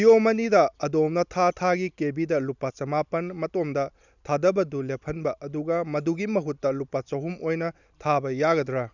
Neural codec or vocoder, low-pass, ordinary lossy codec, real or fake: none; 7.2 kHz; none; real